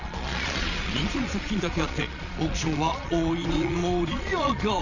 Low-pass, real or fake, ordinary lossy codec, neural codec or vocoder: 7.2 kHz; fake; none; vocoder, 22.05 kHz, 80 mel bands, Vocos